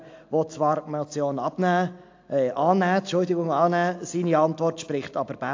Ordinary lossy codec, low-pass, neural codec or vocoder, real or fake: AAC, 48 kbps; 7.2 kHz; none; real